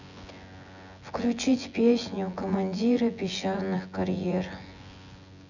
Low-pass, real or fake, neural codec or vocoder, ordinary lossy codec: 7.2 kHz; fake; vocoder, 24 kHz, 100 mel bands, Vocos; none